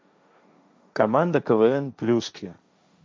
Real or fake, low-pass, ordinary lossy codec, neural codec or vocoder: fake; none; none; codec, 16 kHz, 1.1 kbps, Voila-Tokenizer